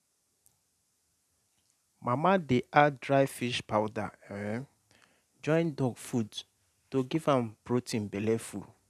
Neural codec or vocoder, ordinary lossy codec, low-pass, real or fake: none; none; 14.4 kHz; real